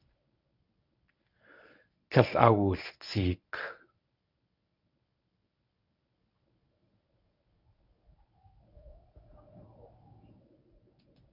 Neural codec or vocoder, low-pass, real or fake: codec, 24 kHz, 0.9 kbps, WavTokenizer, medium speech release version 1; 5.4 kHz; fake